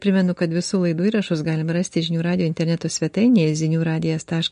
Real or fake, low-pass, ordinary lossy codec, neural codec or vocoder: real; 9.9 kHz; MP3, 48 kbps; none